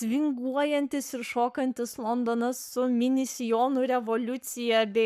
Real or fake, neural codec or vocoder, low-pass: fake; codec, 44.1 kHz, 7.8 kbps, Pupu-Codec; 14.4 kHz